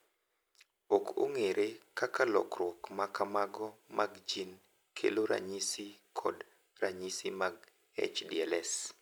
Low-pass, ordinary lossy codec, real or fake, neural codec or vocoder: none; none; real; none